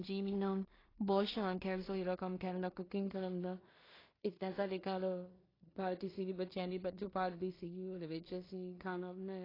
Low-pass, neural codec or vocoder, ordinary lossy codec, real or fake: 5.4 kHz; codec, 16 kHz in and 24 kHz out, 0.4 kbps, LongCat-Audio-Codec, two codebook decoder; AAC, 24 kbps; fake